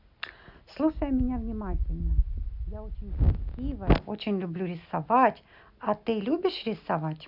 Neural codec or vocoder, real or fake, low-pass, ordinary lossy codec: none; real; 5.4 kHz; none